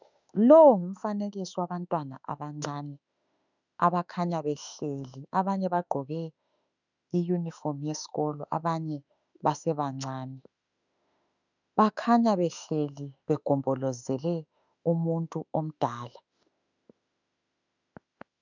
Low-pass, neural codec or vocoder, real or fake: 7.2 kHz; autoencoder, 48 kHz, 32 numbers a frame, DAC-VAE, trained on Japanese speech; fake